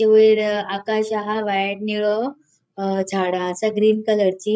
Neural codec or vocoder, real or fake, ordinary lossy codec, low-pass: codec, 16 kHz, 8 kbps, FreqCodec, larger model; fake; none; none